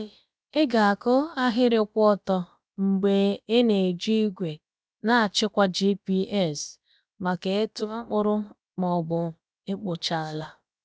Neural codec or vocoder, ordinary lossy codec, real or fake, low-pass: codec, 16 kHz, about 1 kbps, DyCAST, with the encoder's durations; none; fake; none